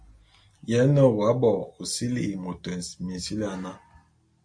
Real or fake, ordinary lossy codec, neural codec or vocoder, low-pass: real; MP3, 48 kbps; none; 9.9 kHz